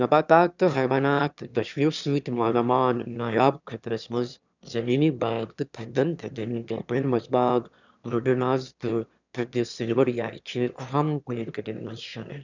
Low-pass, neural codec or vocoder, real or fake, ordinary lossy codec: 7.2 kHz; autoencoder, 22.05 kHz, a latent of 192 numbers a frame, VITS, trained on one speaker; fake; none